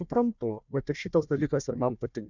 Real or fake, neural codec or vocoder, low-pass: fake; codec, 16 kHz, 1 kbps, FunCodec, trained on Chinese and English, 50 frames a second; 7.2 kHz